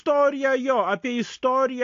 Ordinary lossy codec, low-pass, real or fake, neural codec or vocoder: AAC, 48 kbps; 7.2 kHz; real; none